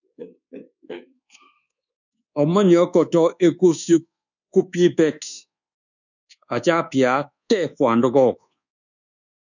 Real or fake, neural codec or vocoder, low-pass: fake; codec, 24 kHz, 1.2 kbps, DualCodec; 7.2 kHz